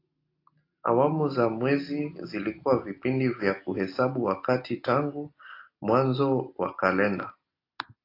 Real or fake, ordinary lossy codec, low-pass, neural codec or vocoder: real; AAC, 32 kbps; 5.4 kHz; none